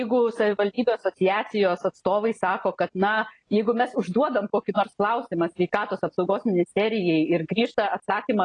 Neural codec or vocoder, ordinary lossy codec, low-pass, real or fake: none; AAC, 32 kbps; 9.9 kHz; real